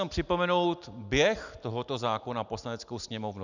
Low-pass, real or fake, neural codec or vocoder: 7.2 kHz; real; none